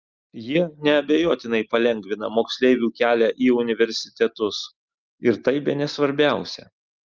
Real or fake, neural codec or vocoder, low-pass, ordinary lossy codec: real; none; 7.2 kHz; Opus, 32 kbps